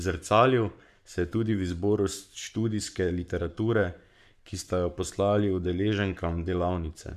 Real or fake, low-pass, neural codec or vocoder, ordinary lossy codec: fake; 14.4 kHz; codec, 44.1 kHz, 7.8 kbps, Pupu-Codec; none